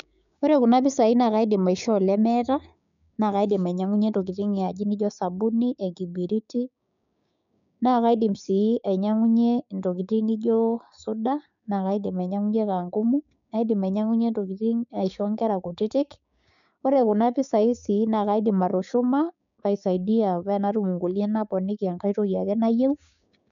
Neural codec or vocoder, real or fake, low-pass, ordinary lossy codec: codec, 16 kHz, 6 kbps, DAC; fake; 7.2 kHz; none